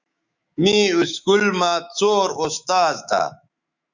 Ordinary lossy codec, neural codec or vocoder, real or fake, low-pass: Opus, 64 kbps; autoencoder, 48 kHz, 128 numbers a frame, DAC-VAE, trained on Japanese speech; fake; 7.2 kHz